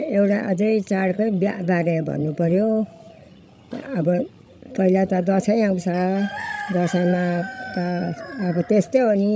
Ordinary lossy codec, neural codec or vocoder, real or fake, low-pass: none; codec, 16 kHz, 16 kbps, FreqCodec, larger model; fake; none